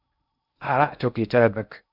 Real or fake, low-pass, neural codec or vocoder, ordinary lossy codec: fake; 5.4 kHz; codec, 16 kHz in and 24 kHz out, 0.6 kbps, FocalCodec, streaming, 2048 codes; Opus, 64 kbps